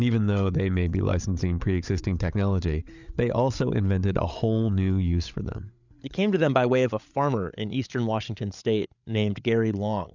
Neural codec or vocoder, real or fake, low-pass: codec, 16 kHz, 8 kbps, FreqCodec, larger model; fake; 7.2 kHz